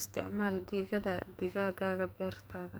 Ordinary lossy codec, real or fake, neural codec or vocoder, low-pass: none; fake; codec, 44.1 kHz, 2.6 kbps, SNAC; none